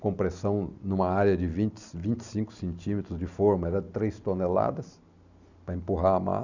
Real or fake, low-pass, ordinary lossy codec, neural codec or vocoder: real; 7.2 kHz; none; none